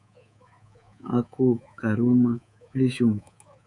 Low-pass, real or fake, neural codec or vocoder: 10.8 kHz; fake; codec, 24 kHz, 3.1 kbps, DualCodec